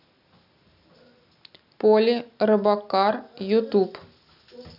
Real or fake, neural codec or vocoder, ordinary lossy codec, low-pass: real; none; none; 5.4 kHz